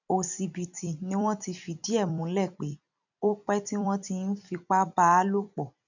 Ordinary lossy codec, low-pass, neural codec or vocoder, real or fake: none; 7.2 kHz; vocoder, 44.1 kHz, 128 mel bands every 256 samples, BigVGAN v2; fake